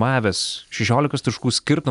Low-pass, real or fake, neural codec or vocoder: 10.8 kHz; real; none